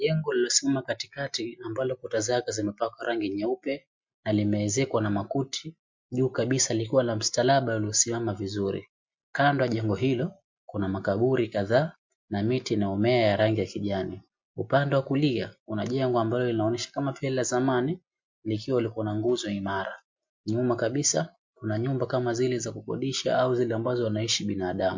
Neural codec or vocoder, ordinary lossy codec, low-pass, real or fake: none; MP3, 48 kbps; 7.2 kHz; real